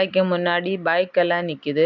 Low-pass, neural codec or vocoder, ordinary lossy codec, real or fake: 7.2 kHz; none; MP3, 64 kbps; real